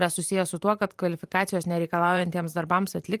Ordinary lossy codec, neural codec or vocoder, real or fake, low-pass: Opus, 32 kbps; vocoder, 44.1 kHz, 128 mel bands every 512 samples, BigVGAN v2; fake; 14.4 kHz